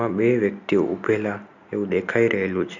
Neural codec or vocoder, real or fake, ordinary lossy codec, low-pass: none; real; none; 7.2 kHz